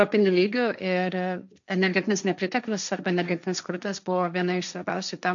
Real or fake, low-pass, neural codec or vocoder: fake; 7.2 kHz; codec, 16 kHz, 1.1 kbps, Voila-Tokenizer